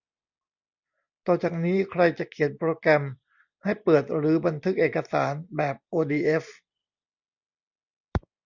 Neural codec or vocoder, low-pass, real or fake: none; 7.2 kHz; real